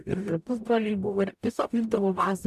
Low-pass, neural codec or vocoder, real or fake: 14.4 kHz; codec, 44.1 kHz, 0.9 kbps, DAC; fake